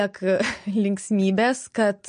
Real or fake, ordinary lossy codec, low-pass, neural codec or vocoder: real; MP3, 48 kbps; 9.9 kHz; none